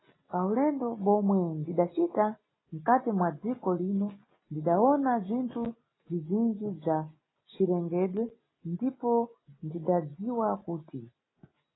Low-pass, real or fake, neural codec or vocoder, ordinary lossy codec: 7.2 kHz; real; none; AAC, 16 kbps